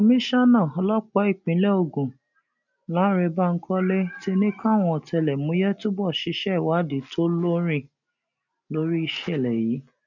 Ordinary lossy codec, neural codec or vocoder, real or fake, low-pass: none; none; real; 7.2 kHz